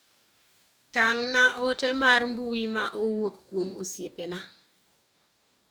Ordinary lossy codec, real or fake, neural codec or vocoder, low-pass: none; fake; codec, 44.1 kHz, 2.6 kbps, DAC; none